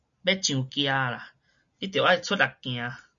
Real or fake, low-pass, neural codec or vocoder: real; 7.2 kHz; none